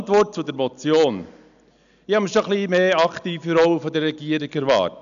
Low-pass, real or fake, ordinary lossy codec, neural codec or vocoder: 7.2 kHz; real; none; none